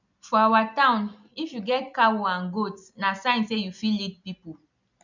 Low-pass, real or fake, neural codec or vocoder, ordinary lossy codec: 7.2 kHz; real; none; none